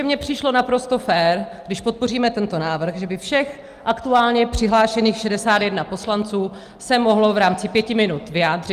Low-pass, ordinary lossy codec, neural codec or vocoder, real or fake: 14.4 kHz; Opus, 32 kbps; none; real